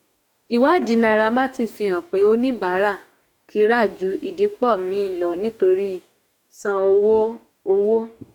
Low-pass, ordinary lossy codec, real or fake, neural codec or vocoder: 19.8 kHz; none; fake; codec, 44.1 kHz, 2.6 kbps, DAC